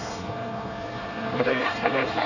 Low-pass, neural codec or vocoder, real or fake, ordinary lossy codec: 7.2 kHz; codec, 24 kHz, 1 kbps, SNAC; fake; none